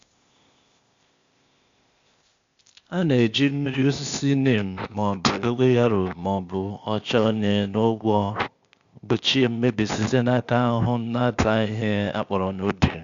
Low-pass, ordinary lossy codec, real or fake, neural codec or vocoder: 7.2 kHz; Opus, 64 kbps; fake; codec, 16 kHz, 0.8 kbps, ZipCodec